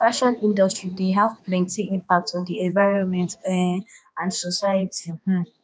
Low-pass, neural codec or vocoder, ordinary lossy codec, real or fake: none; codec, 16 kHz, 2 kbps, X-Codec, HuBERT features, trained on balanced general audio; none; fake